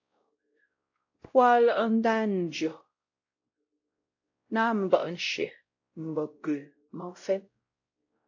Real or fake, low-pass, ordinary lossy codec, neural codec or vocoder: fake; 7.2 kHz; AAC, 48 kbps; codec, 16 kHz, 0.5 kbps, X-Codec, WavLM features, trained on Multilingual LibriSpeech